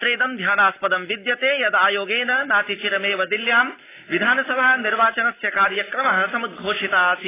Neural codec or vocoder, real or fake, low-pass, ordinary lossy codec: none; real; 3.6 kHz; AAC, 16 kbps